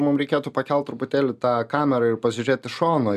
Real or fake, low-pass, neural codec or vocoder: real; 14.4 kHz; none